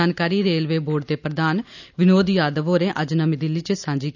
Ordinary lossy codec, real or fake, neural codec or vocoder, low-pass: none; real; none; 7.2 kHz